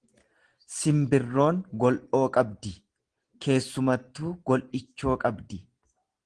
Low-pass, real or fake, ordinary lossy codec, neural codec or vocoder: 9.9 kHz; real; Opus, 16 kbps; none